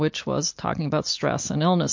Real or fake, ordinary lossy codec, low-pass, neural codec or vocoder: real; MP3, 48 kbps; 7.2 kHz; none